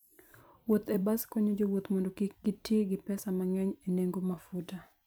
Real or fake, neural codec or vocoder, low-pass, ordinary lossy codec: fake; vocoder, 44.1 kHz, 128 mel bands every 256 samples, BigVGAN v2; none; none